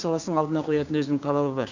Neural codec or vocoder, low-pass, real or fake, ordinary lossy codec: codec, 16 kHz, 6 kbps, DAC; 7.2 kHz; fake; none